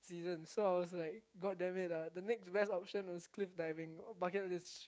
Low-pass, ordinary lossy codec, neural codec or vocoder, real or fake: none; none; codec, 16 kHz, 6 kbps, DAC; fake